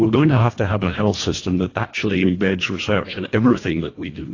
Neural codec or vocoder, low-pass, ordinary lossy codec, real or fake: codec, 24 kHz, 1.5 kbps, HILCodec; 7.2 kHz; AAC, 32 kbps; fake